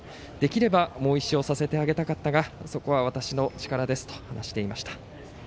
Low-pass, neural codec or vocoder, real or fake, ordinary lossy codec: none; none; real; none